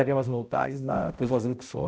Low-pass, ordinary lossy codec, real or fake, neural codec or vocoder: none; none; fake; codec, 16 kHz, 0.5 kbps, X-Codec, HuBERT features, trained on balanced general audio